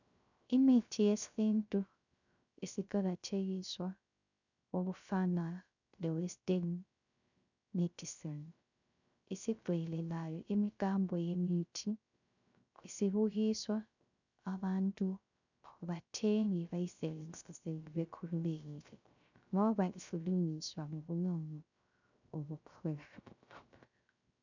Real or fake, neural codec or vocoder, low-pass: fake; codec, 16 kHz, 0.3 kbps, FocalCodec; 7.2 kHz